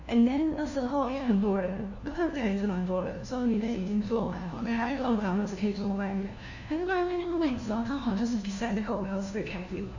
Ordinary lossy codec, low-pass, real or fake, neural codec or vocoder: none; 7.2 kHz; fake; codec, 16 kHz, 1 kbps, FunCodec, trained on LibriTTS, 50 frames a second